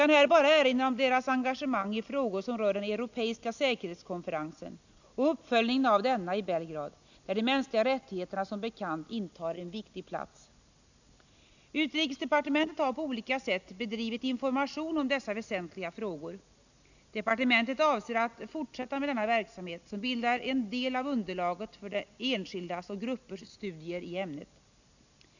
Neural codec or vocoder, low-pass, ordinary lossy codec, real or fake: none; 7.2 kHz; none; real